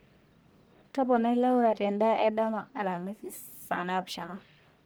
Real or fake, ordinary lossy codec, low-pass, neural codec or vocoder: fake; none; none; codec, 44.1 kHz, 1.7 kbps, Pupu-Codec